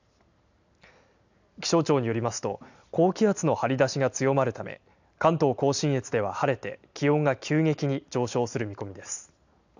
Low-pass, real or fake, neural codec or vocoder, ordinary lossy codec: 7.2 kHz; real; none; none